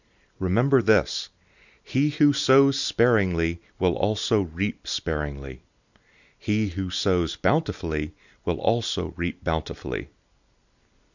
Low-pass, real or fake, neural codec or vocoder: 7.2 kHz; real; none